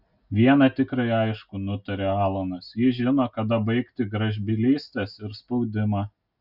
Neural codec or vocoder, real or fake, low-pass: none; real; 5.4 kHz